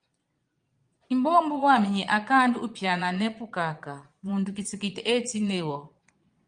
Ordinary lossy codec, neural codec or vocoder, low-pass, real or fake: Opus, 32 kbps; vocoder, 22.05 kHz, 80 mel bands, Vocos; 9.9 kHz; fake